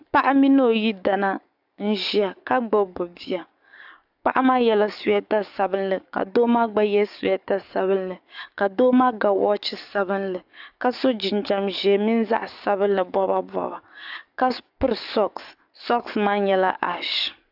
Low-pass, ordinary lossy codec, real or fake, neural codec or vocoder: 5.4 kHz; AAC, 48 kbps; fake; codec, 44.1 kHz, 7.8 kbps, DAC